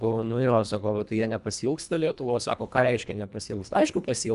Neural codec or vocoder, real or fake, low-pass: codec, 24 kHz, 1.5 kbps, HILCodec; fake; 10.8 kHz